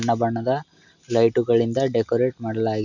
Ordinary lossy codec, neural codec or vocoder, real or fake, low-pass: none; none; real; 7.2 kHz